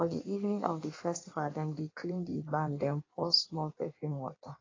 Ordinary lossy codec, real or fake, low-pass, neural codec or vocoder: AAC, 32 kbps; fake; 7.2 kHz; codec, 16 kHz in and 24 kHz out, 1.1 kbps, FireRedTTS-2 codec